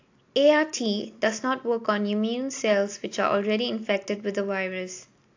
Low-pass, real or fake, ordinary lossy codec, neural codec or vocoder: 7.2 kHz; real; AAC, 48 kbps; none